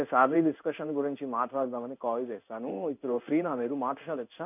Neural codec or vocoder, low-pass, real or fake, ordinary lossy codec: codec, 16 kHz in and 24 kHz out, 1 kbps, XY-Tokenizer; 3.6 kHz; fake; none